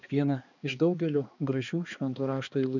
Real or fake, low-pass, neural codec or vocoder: fake; 7.2 kHz; codec, 16 kHz, 2 kbps, X-Codec, HuBERT features, trained on balanced general audio